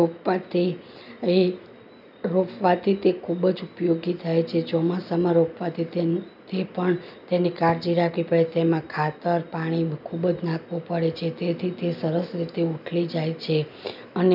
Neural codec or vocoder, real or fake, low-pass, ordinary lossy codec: none; real; 5.4 kHz; none